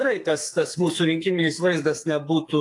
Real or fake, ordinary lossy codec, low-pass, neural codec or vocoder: fake; AAC, 48 kbps; 10.8 kHz; codec, 32 kHz, 1.9 kbps, SNAC